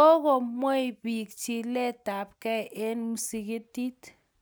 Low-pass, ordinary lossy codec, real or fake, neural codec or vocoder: none; none; real; none